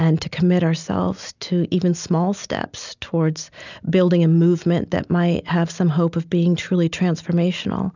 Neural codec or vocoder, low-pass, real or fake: none; 7.2 kHz; real